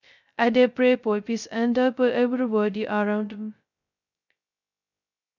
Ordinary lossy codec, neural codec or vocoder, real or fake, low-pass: none; codec, 16 kHz, 0.2 kbps, FocalCodec; fake; 7.2 kHz